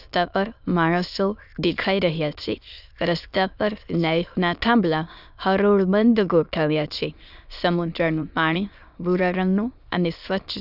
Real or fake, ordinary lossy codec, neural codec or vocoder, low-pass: fake; none; autoencoder, 22.05 kHz, a latent of 192 numbers a frame, VITS, trained on many speakers; 5.4 kHz